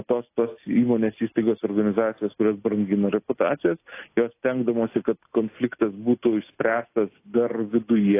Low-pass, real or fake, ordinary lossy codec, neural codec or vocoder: 3.6 kHz; real; AAC, 24 kbps; none